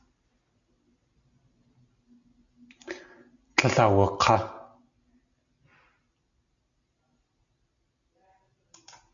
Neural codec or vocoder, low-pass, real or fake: none; 7.2 kHz; real